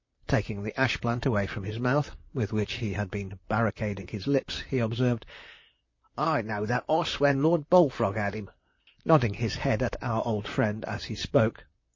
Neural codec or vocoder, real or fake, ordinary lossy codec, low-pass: vocoder, 44.1 kHz, 128 mel bands, Pupu-Vocoder; fake; MP3, 32 kbps; 7.2 kHz